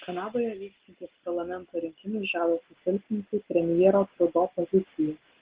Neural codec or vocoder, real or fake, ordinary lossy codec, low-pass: none; real; Opus, 16 kbps; 3.6 kHz